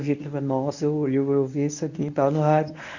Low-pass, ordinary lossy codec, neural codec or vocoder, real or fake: 7.2 kHz; none; codec, 24 kHz, 0.9 kbps, WavTokenizer, medium speech release version 1; fake